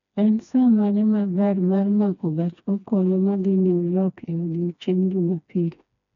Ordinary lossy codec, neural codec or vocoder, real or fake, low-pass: none; codec, 16 kHz, 2 kbps, FreqCodec, smaller model; fake; 7.2 kHz